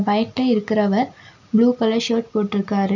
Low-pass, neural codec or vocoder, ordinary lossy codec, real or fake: 7.2 kHz; none; none; real